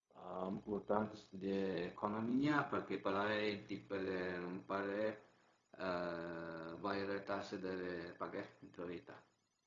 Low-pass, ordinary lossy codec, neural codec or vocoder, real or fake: 7.2 kHz; AAC, 32 kbps; codec, 16 kHz, 0.4 kbps, LongCat-Audio-Codec; fake